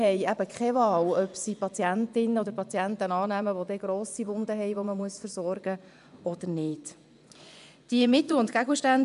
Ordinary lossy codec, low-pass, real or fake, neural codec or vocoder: none; 10.8 kHz; fake; vocoder, 24 kHz, 100 mel bands, Vocos